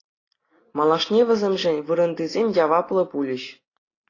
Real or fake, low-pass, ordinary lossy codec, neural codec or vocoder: real; 7.2 kHz; AAC, 32 kbps; none